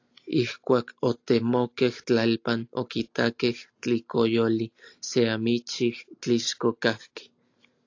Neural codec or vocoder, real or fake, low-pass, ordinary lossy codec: none; real; 7.2 kHz; AAC, 48 kbps